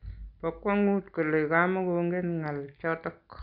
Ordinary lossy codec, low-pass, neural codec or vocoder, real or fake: none; 5.4 kHz; none; real